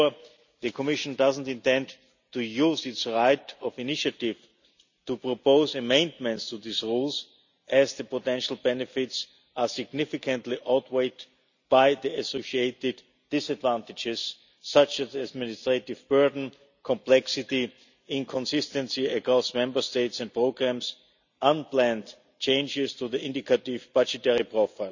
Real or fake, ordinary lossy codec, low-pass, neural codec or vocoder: real; none; 7.2 kHz; none